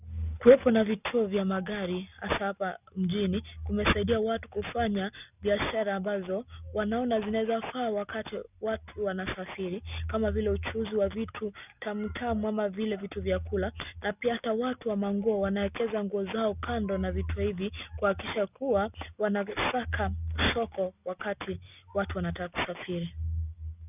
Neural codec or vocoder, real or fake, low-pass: none; real; 3.6 kHz